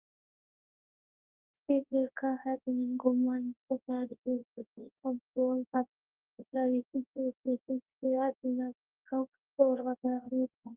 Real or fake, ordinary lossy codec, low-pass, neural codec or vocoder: fake; Opus, 24 kbps; 3.6 kHz; codec, 24 kHz, 0.9 kbps, WavTokenizer, large speech release